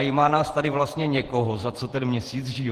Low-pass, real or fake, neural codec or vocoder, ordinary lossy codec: 14.4 kHz; fake; vocoder, 48 kHz, 128 mel bands, Vocos; Opus, 16 kbps